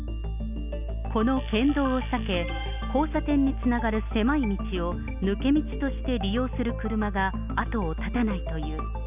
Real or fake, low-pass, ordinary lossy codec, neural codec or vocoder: real; 3.6 kHz; none; none